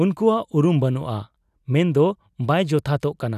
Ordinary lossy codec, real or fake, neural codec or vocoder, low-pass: none; real; none; 14.4 kHz